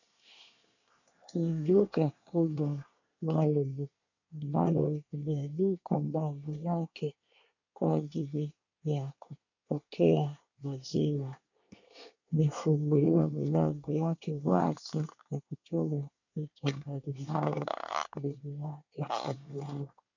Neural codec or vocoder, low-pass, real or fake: codec, 24 kHz, 1 kbps, SNAC; 7.2 kHz; fake